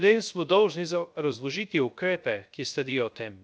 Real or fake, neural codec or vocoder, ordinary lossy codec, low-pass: fake; codec, 16 kHz, 0.3 kbps, FocalCodec; none; none